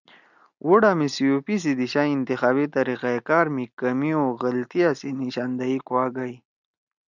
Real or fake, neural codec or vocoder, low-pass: real; none; 7.2 kHz